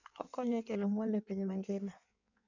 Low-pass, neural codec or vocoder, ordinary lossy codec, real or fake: 7.2 kHz; codec, 16 kHz in and 24 kHz out, 1.1 kbps, FireRedTTS-2 codec; none; fake